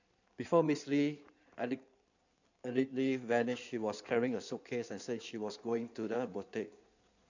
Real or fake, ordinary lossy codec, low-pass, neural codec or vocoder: fake; none; 7.2 kHz; codec, 16 kHz in and 24 kHz out, 2.2 kbps, FireRedTTS-2 codec